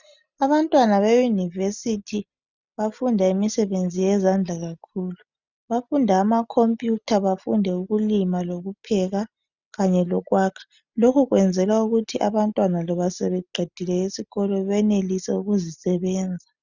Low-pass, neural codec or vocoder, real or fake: 7.2 kHz; none; real